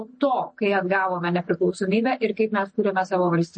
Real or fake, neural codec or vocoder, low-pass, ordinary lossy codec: real; none; 9.9 kHz; MP3, 32 kbps